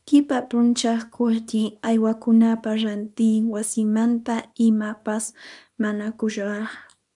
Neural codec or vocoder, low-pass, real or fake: codec, 24 kHz, 0.9 kbps, WavTokenizer, small release; 10.8 kHz; fake